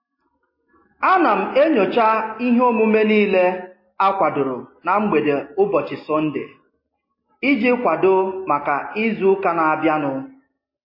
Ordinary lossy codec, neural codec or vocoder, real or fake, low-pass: MP3, 24 kbps; none; real; 5.4 kHz